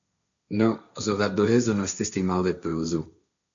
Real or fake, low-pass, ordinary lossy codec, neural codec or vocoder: fake; 7.2 kHz; MP3, 64 kbps; codec, 16 kHz, 1.1 kbps, Voila-Tokenizer